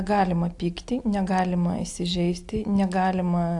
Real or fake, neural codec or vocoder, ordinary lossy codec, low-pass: real; none; AAC, 64 kbps; 10.8 kHz